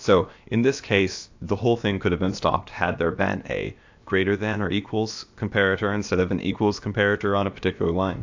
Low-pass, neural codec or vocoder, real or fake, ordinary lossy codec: 7.2 kHz; codec, 16 kHz, about 1 kbps, DyCAST, with the encoder's durations; fake; AAC, 48 kbps